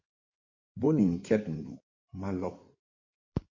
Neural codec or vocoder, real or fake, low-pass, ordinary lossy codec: codec, 16 kHz in and 24 kHz out, 2.2 kbps, FireRedTTS-2 codec; fake; 7.2 kHz; MP3, 32 kbps